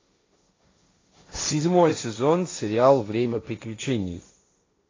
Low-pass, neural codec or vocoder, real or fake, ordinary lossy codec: 7.2 kHz; codec, 16 kHz, 1.1 kbps, Voila-Tokenizer; fake; AAC, 32 kbps